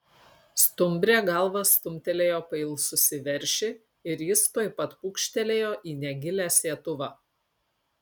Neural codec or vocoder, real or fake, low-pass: none; real; 19.8 kHz